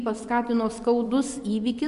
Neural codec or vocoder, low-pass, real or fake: vocoder, 24 kHz, 100 mel bands, Vocos; 10.8 kHz; fake